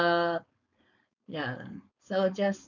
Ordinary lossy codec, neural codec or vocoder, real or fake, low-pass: none; codec, 16 kHz, 4.8 kbps, FACodec; fake; 7.2 kHz